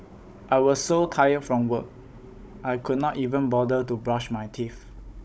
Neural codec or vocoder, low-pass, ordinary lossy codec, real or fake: codec, 16 kHz, 16 kbps, FunCodec, trained on Chinese and English, 50 frames a second; none; none; fake